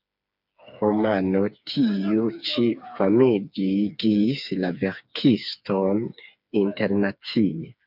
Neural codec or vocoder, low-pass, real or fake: codec, 16 kHz, 4 kbps, FreqCodec, smaller model; 5.4 kHz; fake